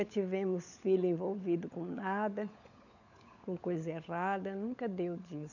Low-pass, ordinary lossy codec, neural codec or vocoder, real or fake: 7.2 kHz; none; codec, 16 kHz, 16 kbps, FunCodec, trained on LibriTTS, 50 frames a second; fake